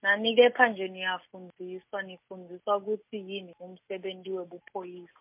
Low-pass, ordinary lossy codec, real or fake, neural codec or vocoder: 3.6 kHz; MP3, 32 kbps; real; none